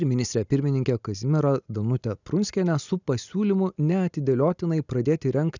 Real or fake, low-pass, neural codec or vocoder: real; 7.2 kHz; none